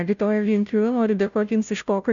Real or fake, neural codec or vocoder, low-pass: fake; codec, 16 kHz, 0.5 kbps, FunCodec, trained on Chinese and English, 25 frames a second; 7.2 kHz